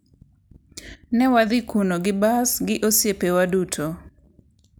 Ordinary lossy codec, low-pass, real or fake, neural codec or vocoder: none; none; real; none